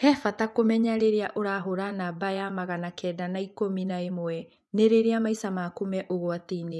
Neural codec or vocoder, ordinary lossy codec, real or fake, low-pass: vocoder, 24 kHz, 100 mel bands, Vocos; none; fake; none